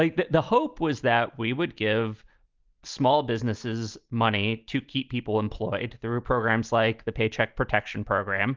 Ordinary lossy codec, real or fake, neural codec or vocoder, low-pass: Opus, 32 kbps; real; none; 7.2 kHz